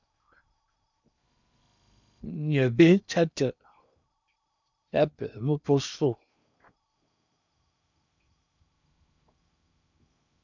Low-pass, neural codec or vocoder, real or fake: 7.2 kHz; codec, 16 kHz in and 24 kHz out, 0.8 kbps, FocalCodec, streaming, 65536 codes; fake